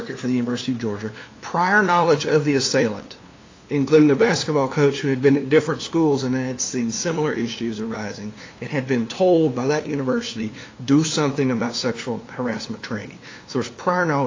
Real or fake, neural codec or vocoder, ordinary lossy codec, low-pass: fake; codec, 16 kHz, 2 kbps, FunCodec, trained on LibriTTS, 25 frames a second; AAC, 32 kbps; 7.2 kHz